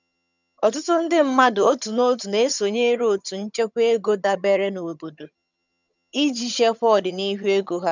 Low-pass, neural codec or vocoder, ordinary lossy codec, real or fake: 7.2 kHz; vocoder, 22.05 kHz, 80 mel bands, HiFi-GAN; none; fake